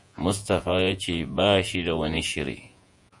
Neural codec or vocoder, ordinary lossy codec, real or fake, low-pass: vocoder, 48 kHz, 128 mel bands, Vocos; Opus, 64 kbps; fake; 10.8 kHz